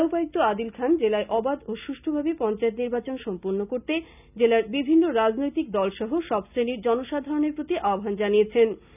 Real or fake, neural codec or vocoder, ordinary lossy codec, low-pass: real; none; none; 3.6 kHz